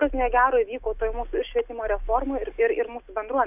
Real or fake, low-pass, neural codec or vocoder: real; 3.6 kHz; none